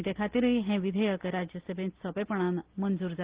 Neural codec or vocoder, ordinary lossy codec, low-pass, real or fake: none; Opus, 16 kbps; 3.6 kHz; real